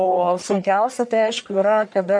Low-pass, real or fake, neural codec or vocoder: 9.9 kHz; fake; codec, 44.1 kHz, 1.7 kbps, Pupu-Codec